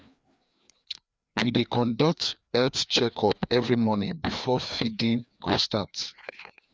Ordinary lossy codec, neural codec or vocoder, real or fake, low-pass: none; codec, 16 kHz, 2 kbps, FreqCodec, larger model; fake; none